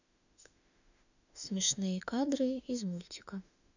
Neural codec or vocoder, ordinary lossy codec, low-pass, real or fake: autoencoder, 48 kHz, 32 numbers a frame, DAC-VAE, trained on Japanese speech; none; 7.2 kHz; fake